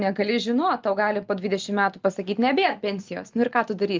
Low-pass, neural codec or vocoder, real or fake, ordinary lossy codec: 7.2 kHz; none; real; Opus, 24 kbps